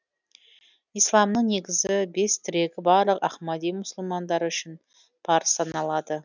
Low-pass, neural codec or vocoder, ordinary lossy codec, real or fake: 7.2 kHz; none; none; real